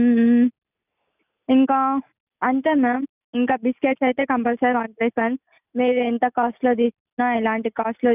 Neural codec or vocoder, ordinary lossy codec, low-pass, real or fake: none; none; 3.6 kHz; real